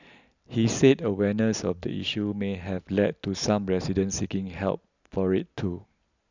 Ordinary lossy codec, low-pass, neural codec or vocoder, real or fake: none; 7.2 kHz; none; real